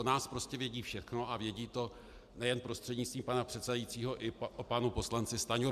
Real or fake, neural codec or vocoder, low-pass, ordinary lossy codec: real; none; 14.4 kHz; MP3, 96 kbps